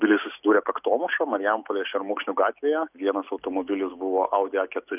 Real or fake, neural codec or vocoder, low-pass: real; none; 3.6 kHz